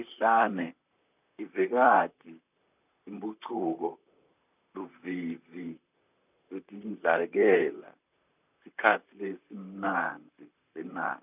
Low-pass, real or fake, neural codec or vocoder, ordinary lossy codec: 3.6 kHz; fake; vocoder, 44.1 kHz, 128 mel bands, Pupu-Vocoder; none